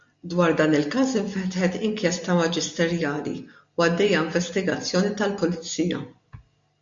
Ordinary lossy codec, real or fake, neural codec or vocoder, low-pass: MP3, 48 kbps; real; none; 7.2 kHz